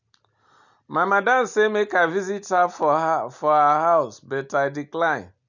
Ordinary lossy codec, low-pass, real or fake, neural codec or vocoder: none; 7.2 kHz; real; none